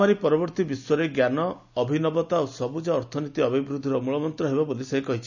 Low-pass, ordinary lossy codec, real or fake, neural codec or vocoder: 7.2 kHz; none; real; none